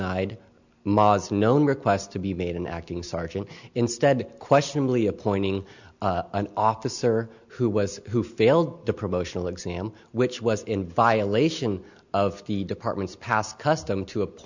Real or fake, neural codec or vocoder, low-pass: real; none; 7.2 kHz